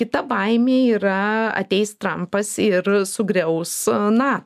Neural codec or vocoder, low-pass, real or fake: none; 14.4 kHz; real